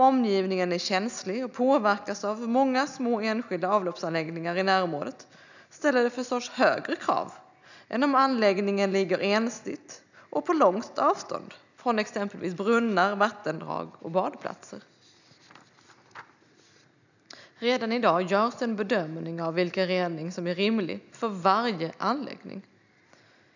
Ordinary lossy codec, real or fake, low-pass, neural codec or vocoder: none; real; 7.2 kHz; none